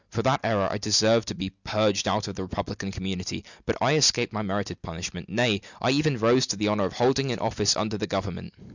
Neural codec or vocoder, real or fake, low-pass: none; real; 7.2 kHz